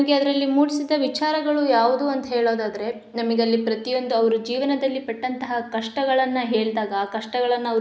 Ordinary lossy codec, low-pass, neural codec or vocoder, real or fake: none; none; none; real